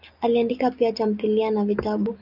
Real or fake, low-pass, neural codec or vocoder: real; 5.4 kHz; none